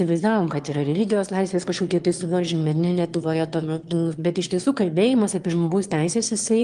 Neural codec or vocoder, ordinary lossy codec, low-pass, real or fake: autoencoder, 22.05 kHz, a latent of 192 numbers a frame, VITS, trained on one speaker; Opus, 24 kbps; 9.9 kHz; fake